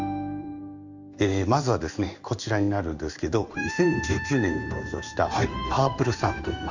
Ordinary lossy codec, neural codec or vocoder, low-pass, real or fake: none; codec, 16 kHz in and 24 kHz out, 1 kbps, XY-Tokenizer; 7.2 kHz; fake